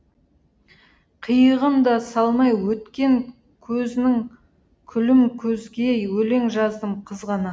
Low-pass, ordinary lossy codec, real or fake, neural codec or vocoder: none; none; real; none